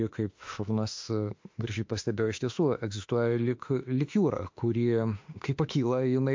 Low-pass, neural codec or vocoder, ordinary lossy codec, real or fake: 7.2 kHz; autoencoder, 48 kHz, 32 numbers a frame, DAC-VAE, trained on Japanese speech; MP3, 48 kbps; fake